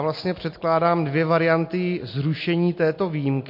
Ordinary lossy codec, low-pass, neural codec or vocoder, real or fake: MP3, 32 kbps; 5.4 kHz; none; real